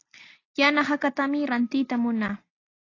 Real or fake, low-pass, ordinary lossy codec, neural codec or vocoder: real; 7.2 kHz; AAC, 32 kbps; none